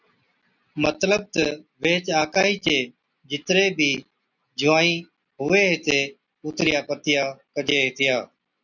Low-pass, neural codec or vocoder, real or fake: 7.2 kHz; none; real